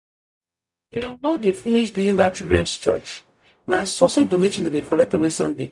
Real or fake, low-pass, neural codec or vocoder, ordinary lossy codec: fake; 10.8 kHz; codec, 44.1 kHz, 0.9 kbps, DAC; none